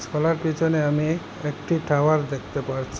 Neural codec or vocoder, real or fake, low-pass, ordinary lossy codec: none; real; none; none